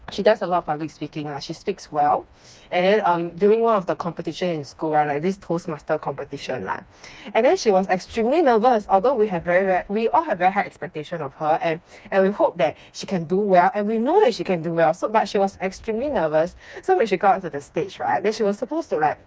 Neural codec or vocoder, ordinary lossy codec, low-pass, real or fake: codec, 16 kHz, 2 kbps, FreqCodec, smaller model; none; none; fake